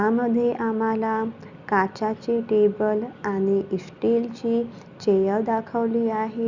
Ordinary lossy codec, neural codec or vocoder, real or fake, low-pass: Opus, 64 kbps; none; real; 7.2 kHz